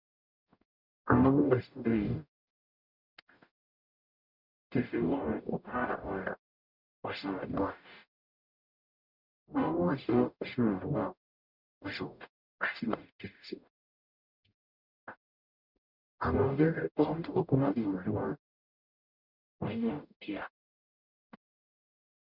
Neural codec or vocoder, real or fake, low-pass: codec, 44.1 kHz, 0.9 kbps, DAC; fake; 5.4 kHz